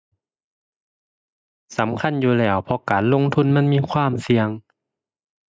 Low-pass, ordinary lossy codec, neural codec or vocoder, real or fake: none; none; none; real